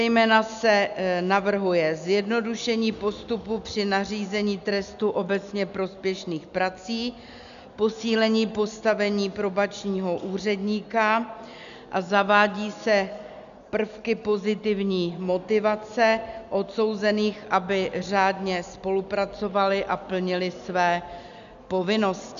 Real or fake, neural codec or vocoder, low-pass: real; none; 7.2 kHz